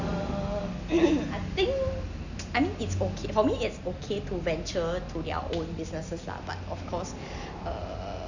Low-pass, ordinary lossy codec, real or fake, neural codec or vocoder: 7.2 kHz; none; real; none